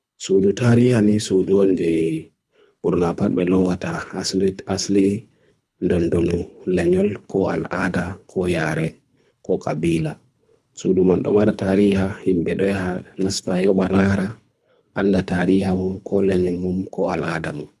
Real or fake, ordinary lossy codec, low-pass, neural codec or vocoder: fake; none; none; codec, 24 kHz, 3 kbps, HILCodec